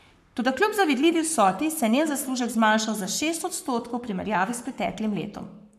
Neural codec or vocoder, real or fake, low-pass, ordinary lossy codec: codec, 44.1 kHz, 7.8 kbps, Pupu-Codec; fake; 14.4 kHz; none